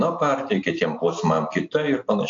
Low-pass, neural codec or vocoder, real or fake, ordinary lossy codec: 7.2 kHz; none; real; AAC, 48 kbps